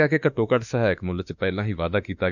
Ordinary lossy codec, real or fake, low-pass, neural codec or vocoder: none; fake; 7.2 kHz; autoencoder, 48 kHz, 32 numbers a frame, DAC-VAE, trained on Japanese speech